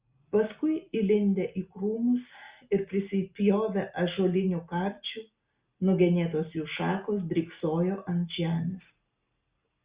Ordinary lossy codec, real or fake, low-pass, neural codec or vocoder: Opus, 64 kbps; real; 3.6 kHz; none